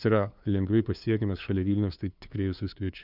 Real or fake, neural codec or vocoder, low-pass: fake; codec, 16 kHz, 2 kbps, FunCodec, trained on LibriTTS, 25 frames a second; 5.4 kHz